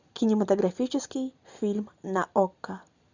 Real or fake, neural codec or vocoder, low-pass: real; none; 7.2 kHz